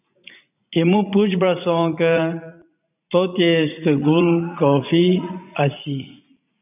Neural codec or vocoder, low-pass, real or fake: vocoder, 44.1 kHz, 80 mel bands, Vocos; 3.6 kHz; fake